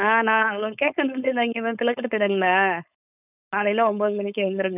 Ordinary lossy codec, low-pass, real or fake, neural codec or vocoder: none; 3.6 kHz; fake; codec, 16 kHz, 4.8 kbps, FACodec